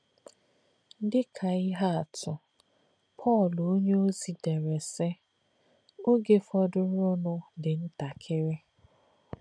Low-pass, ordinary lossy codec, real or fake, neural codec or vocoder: 9.9 kHz; none; real; none